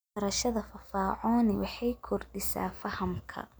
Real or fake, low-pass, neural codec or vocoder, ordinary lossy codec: real; none; none; none